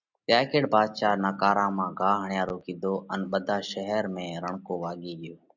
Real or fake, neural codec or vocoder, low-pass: real; none; 7.2 kHz